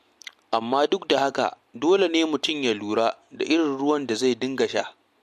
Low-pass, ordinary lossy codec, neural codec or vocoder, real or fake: 14.4 kHz; MP3, 64 kbps; none; real